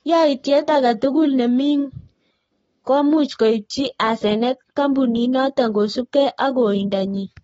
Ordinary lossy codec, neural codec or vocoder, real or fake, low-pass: AAC, 24 kbps; codec, 44.1 kHz, 7.8 kbps, Pupu-Codec; fake; 19.8 kHz